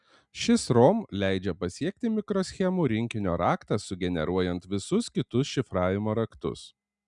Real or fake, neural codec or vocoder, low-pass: real; none; 10.8 kHz